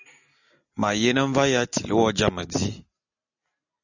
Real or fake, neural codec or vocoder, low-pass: real; none; 7.2 kHz